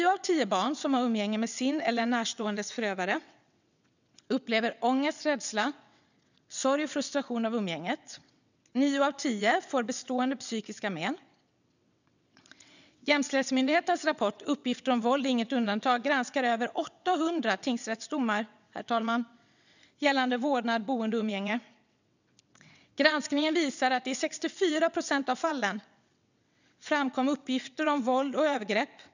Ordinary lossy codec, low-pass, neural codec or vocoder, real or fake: none; 7.2 kHz; vocoder, 22.05 kHz, 80 mel bands, WaveNeXt; fake